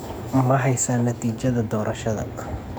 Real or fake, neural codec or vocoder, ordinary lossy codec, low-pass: fake; codec, 44.1 kHz, 7.8 kbps, DAC; none; none